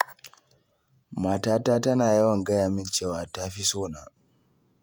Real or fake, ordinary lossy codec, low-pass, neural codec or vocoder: fake; none; none; vocoder, 48 kHz, 128 mel bands, Vocos